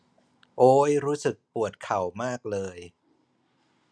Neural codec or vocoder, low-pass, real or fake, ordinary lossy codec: none; none; real; none